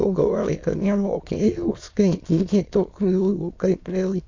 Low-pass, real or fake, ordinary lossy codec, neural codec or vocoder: 7.2 kHz; fake; AAC, 48 kbps; autoencoder, 22.05 kHz, a latent of 192 numbers a frame, VITS, trained on many speakers